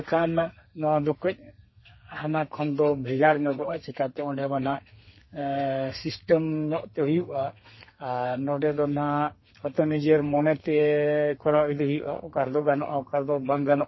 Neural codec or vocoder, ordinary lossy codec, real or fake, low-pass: codec, 32 kHz, 1.9 kbps, SNAC; MP3, 24 kbps; fake; 7.2 kHz